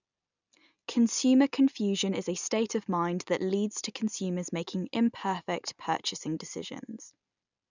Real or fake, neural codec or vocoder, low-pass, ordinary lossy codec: real; none; 7.2 kHz; none